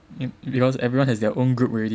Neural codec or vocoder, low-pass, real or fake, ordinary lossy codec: none; none; real; none